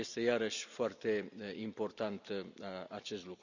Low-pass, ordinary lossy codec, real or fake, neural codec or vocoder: 7.2 kHz; none; real; none